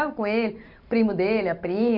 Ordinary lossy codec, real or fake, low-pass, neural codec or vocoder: none; fake; 5.4 kHz; vocoder, 44.1 kHz, 128 mel bands every 256 samples, BigVGAN v2